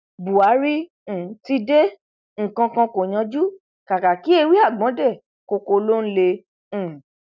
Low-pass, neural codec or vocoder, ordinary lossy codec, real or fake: 7.2 kHz; none; none; real